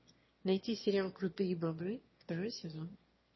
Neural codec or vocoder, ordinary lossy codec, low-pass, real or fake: autoencoder, 22.05 kHz, a latent of 192 numbers a frame, VITS, trained on one speaker; MP3, 24 kbps; 7.2 kHz; fake